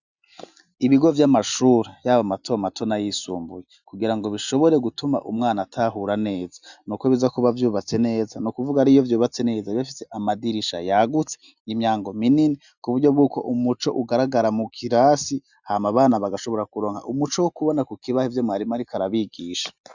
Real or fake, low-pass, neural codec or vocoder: real; 7.2 kHz; none